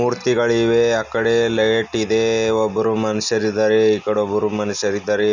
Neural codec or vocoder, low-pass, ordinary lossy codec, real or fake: none; 7.2 kHz; none; real